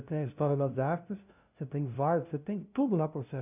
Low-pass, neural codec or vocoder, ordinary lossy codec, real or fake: 3.6 kHz; codec, 16 kHz, 0.5 kbps, FunCodec, trained on LibriTTS, 25 frames a second; none; fake